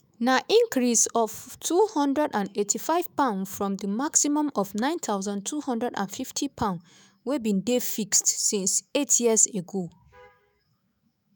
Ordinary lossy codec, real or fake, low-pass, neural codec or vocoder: none; fake; none; autoencoder, 48 kHz, 128 numbers a frame, DAC-VAE, trained on Japanese speech